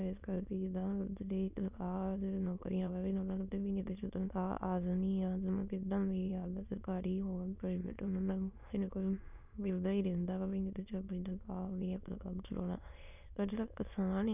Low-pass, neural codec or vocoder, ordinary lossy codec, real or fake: 3.6 kHz; autoencoder, 22.05 kHz, a latent of 192 numbers a frame, VITS, trained on many speakers; none; fake